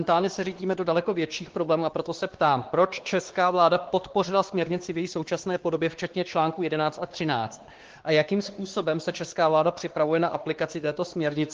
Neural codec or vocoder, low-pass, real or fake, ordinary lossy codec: codec, 16 kHz, 2 kbps, X-Codec, WavLM features, trained on Multilingual LibriSpeech; 7.2 kHz; fake; Opus, 16 kbps